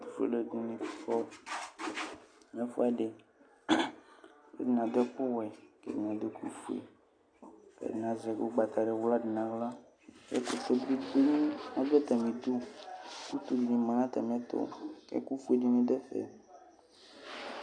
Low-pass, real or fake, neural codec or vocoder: 9.9 kHz; real; none